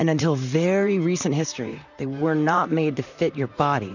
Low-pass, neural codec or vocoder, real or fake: 7.2 kHz; vocoder, 44.1 kHz, 128 mel bands, Pupu-Vocoder; fake